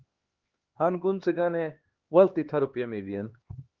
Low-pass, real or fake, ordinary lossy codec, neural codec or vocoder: 7.2 kHz; fake; Opus, 16 kbps; codec, 16 kHz, 2 kbps, X-Codec, HuBERT features, trained on LibriSpeech